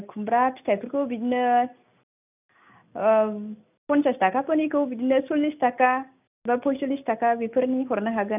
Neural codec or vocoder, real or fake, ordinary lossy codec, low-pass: none; real; none; 3.6 kHz